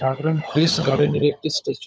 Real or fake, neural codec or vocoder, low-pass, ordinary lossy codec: fake; codec, 16 kHz, 16 kbps, FunCodec, trained on LibriTTS, 50 frames a second; none; none